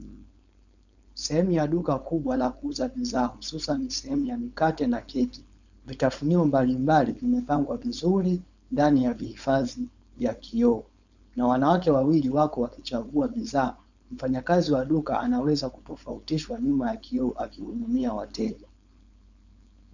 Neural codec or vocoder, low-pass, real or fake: codec, 16 kHz, 4.8 kbps, FACodec; 7.2 kHz; fake